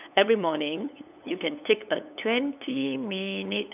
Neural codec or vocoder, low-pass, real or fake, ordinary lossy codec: codec, 16 kHz, 8 kbps, FunCodec, trained on LibriTTS, 25 frames a second; 3.6 kHz; fake; none